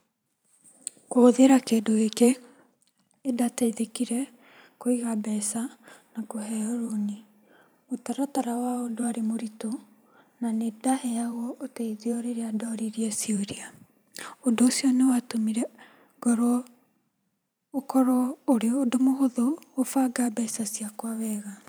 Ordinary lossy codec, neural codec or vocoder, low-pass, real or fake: none; vocoder, 44.1 kHz, 128 mel bands every 512 samples, BigVGAN v2; none; fake